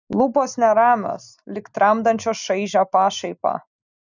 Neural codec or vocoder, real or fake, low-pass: none; real; 7.2 kHz